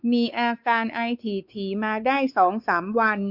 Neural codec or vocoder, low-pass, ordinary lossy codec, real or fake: codec, 16 kHz, 4 kbps, X-Codec, WavLM features, trained on Multilingual LibriSpeech; 5.4 kHz; none; fake